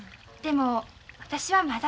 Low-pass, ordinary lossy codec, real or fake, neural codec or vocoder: none; none; real; none